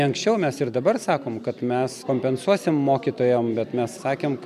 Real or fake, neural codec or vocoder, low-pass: real; none; 14.4 kHz